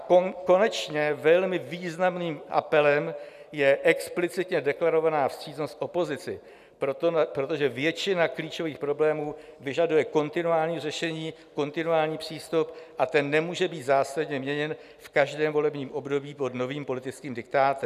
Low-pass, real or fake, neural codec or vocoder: 14.4 kHz; real; none